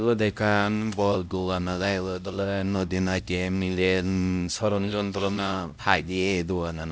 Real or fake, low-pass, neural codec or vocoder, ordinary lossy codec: fake; none; codec, 16 kHz, 0.5 kbps, X-Codec, HuBERT features, trained on LibriSpeech; none